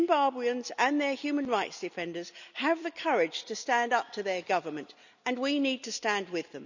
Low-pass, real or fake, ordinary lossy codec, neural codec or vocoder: 7.2 kHz; real; none; none